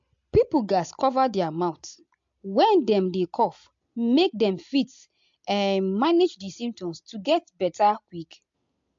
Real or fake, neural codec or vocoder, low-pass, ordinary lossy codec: real; none; 7.2 kHz; MP3, 48 kbps